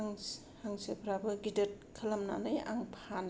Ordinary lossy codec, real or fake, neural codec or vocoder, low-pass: none; real; none; none